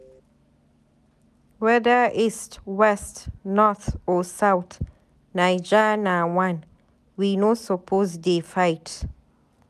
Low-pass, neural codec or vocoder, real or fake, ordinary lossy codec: 14.4 kHz; none; real; none